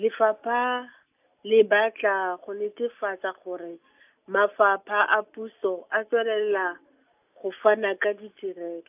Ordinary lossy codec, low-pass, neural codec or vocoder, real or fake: AAC, 32 kbps; 3.6 kHz; none; real